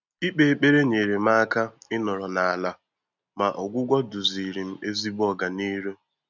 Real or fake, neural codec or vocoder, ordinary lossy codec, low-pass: real; none; none; 7.2 kHz